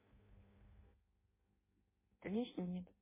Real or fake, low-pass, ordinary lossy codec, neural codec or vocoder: fake; 3.6 kHz; MP3, 16 kbps; codec, 16 kHz in and 24 kHz out, 0.6 kbps, FireRedTTS-2 codec